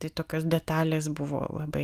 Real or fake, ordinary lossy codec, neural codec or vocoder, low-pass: real; Opus, 32 kbps; none; 14.4 kHz